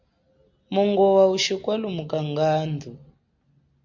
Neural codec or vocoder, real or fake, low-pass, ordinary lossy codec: none; real; 7.2 kHz; MP3, 64 kbps